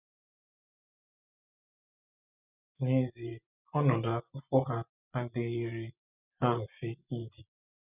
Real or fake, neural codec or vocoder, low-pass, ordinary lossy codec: fake; vocoder, 24 kHz, 100 mel bands, Vocos; 3.6 kHz; none